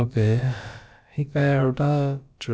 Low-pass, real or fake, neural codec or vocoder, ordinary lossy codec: none; fake; codec, 16 kHz, about 1 kbps, DyCAST, with the encoder's durations; none